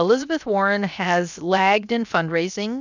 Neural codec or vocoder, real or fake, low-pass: codec, 16 kHz, 0.7 kbps, FocalCodec; fake; 7.2 kHz